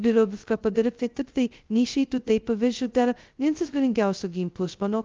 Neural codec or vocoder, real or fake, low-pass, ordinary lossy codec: codec, 16 kHz, 0.2 kbps, FocalCodec; fake; 7.2 kHz; Opus, 32 kbps